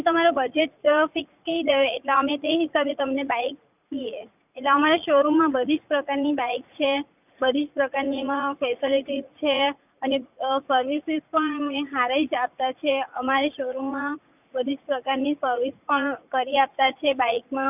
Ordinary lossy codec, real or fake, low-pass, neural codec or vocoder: none; fake; 3.6 kHz; vocoder, 44.1 kHz, 80 mel bands, Vocos